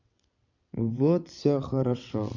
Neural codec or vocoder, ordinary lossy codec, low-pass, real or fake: vocoder, 22.05 kHz, 80 mel bands, WaveNeXt; none; 7.2 kHz; fake